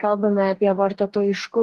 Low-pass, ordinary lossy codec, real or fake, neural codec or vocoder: 14.4 kHz; Opus, 16 kbps; fake; codec, 44.1 kHz, 2.6 kbps, DAC